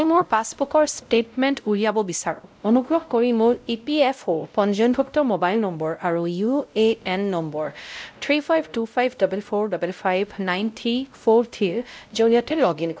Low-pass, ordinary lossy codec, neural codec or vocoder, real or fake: none; none; codec, 16 kHz, 0.5 kbps, X-Codec, WavLM features, trained on Multilingual LibriSpeech; fake